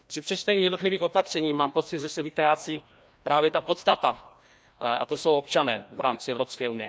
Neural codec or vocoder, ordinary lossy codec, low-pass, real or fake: codec, 16 kHz, 1 kbps, FreqCodec, larger model; none; none; fake